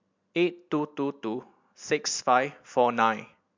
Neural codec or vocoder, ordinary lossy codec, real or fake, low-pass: none; MP3, 64 kbps; real; 7.2 kHz